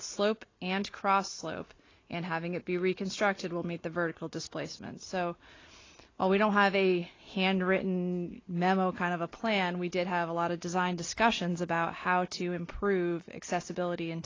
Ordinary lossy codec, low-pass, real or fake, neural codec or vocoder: AAC, 32 kbps; 7.2 kHz; real; none